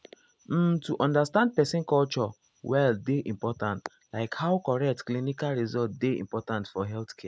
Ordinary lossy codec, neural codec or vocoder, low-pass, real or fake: none; none; none; real